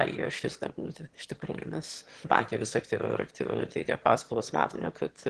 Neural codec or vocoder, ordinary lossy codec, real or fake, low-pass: autoencoder, 22.05 kHz, a latent of 192 numbers a frame, VITS, trained on one speaker; Opus, 16 kbps; fake; 9.9 kHz